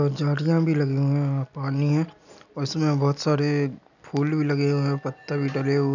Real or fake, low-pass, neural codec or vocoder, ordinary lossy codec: real; 7.2 kHz; none; none